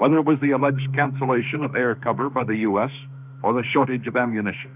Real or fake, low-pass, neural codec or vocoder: fake; 3.6 kHz; autoencoder, 48 kHz, 32 numbers a frame, DAC-VAE, trained on Japanese speech